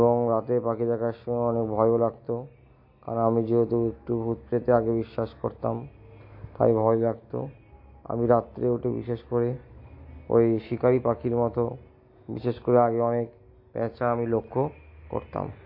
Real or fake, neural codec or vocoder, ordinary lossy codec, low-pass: real; none; MP3, 32 kbps; 5.4 kHz